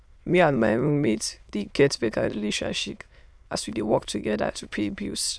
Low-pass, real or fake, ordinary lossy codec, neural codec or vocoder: none; fake; none; autoencoder, 22.05 kHz, a latent of 192 numbers a frame, VITS, trained on many speakers